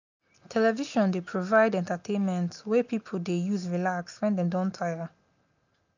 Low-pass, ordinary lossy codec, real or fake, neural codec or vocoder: 7.2 kHz; none; real; none